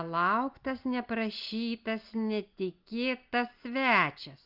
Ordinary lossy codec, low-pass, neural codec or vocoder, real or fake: Opus, 24 kbps; 5.4 kHz; none; real